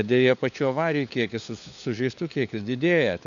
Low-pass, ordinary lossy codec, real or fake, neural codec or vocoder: 7.2 kHz; MP3, 96 kbps; fake; codec, 16 kHz, 4 kbps, FunCodec, trained on LibriTTS, 50 frames a second